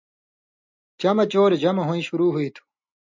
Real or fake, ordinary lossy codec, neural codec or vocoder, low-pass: real; MP3, 64 kbps; none; 7.2 kHz